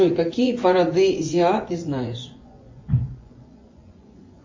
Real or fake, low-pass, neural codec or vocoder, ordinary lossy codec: fake; 7.2 kHz; vocoder, 24 kHz, 100 mel bands, Vocos; MP3, 32 kbps